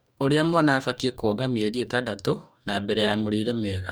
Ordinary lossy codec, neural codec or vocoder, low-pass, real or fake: none; codec, 44.1 kHz, 2.6 kbps, DAC; none; fake